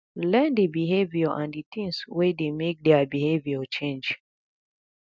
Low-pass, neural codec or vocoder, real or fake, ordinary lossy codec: none; none; real; none